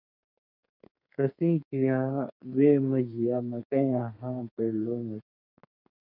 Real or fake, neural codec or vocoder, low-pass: fake; codec, 32 kHz, 1.9 kbps, SNAC; 5.4 kHz